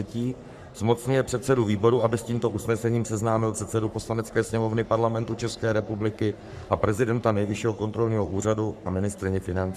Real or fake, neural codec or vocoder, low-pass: fake; codec, 44.1 kHz, 3.4 kbps, Pupu-Codec; 14.4 kHz